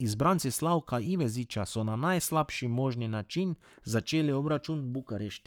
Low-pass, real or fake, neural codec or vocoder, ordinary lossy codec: 19.8 kHz; fake; codec, 44.1 kHz, 7.8 kbps, Pupu-Codec; none